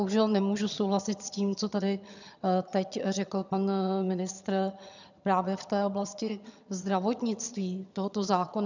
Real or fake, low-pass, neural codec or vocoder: fake; 7.2 kHz; vocoder, 22.05 kHz, 80 mel bands, HiFi-GAN